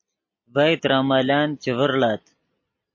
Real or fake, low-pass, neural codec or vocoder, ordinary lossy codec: real; 7.2 kHz; none; MP3, 32 kbps